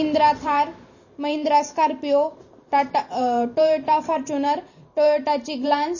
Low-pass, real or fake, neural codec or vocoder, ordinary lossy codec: 7.2 kHz; fake; vocoder, 44.1 kHz, 128 mel bands every 512 samples, BigVGAN v2; MP3, 32 kbps